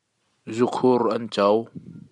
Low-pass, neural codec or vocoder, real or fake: 10.8 kHz; none; real